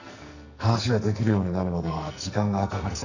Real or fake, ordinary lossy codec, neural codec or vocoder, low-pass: fake; none; codec, 44.1 kHz, 2.6 kbps, SNAC; 7.2 kHz